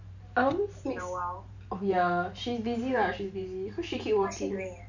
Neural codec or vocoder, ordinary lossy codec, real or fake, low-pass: vocoder, 44.1 kHz, 128 mel bands every 512 samples, BigVGAN v2; none; fake; 7.2 kHz